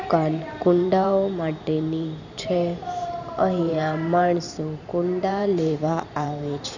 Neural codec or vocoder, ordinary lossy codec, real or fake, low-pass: vocoder, 44.1 kHz, 128 mel bands every 512 samples, BigVGAN v2; none; fake; 7.2 kHz